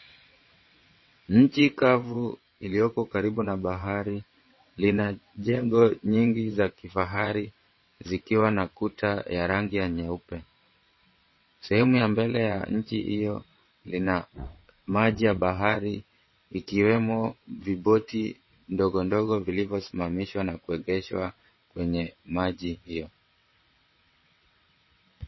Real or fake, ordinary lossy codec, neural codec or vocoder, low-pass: fake; MP3, 24 kbps; vocoder, 22.05 kHz, 80 mel bands, WaveNeXt; 7.2 kHz